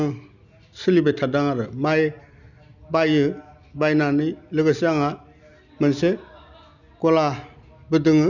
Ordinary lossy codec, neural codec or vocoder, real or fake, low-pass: none; none; real; 7.2 kHz